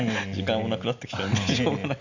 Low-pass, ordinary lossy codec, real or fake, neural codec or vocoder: 7.2 kHz; none; real; none